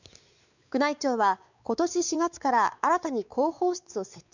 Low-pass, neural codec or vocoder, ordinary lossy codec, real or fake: 7.2 kHz; codec, 16 kHz, 4 kbps, X-Codec, WavLM features, trained on Multilingual LibriSpeech; none; fake